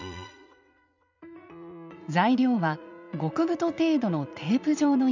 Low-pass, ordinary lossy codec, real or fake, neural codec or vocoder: 7.2 kHz; none; fake; vocoder, 44.1 kHz, 80 mel bands, Vocos